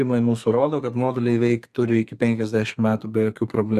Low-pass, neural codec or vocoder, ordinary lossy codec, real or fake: 14.4 kHz; codec, 32 kHz, 1.9 kbps, SNAC; Opus, 64 kbps; fake